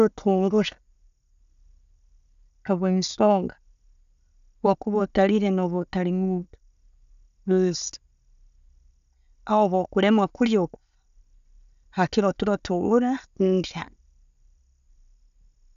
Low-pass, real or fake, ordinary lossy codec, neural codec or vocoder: 7.2 kHz; real; AAC, 96 kbps; none